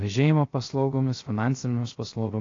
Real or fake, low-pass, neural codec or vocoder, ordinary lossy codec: fake; 7.2 kHz; codec, 16 kHz, 0.3 kbps, FocalCodec; AAC, 32 kbps